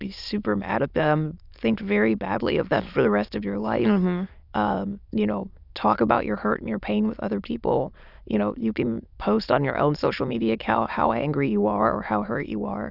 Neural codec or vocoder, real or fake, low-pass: autoencoder, 22.05 kHz, a latent of 192 numbers a frame, VITS, trained on many speakers; fake; 5.4 kHz